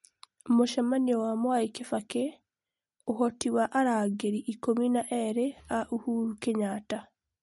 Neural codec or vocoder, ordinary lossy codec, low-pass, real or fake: none; MP3, 48 kbps; 14.4 kHz; real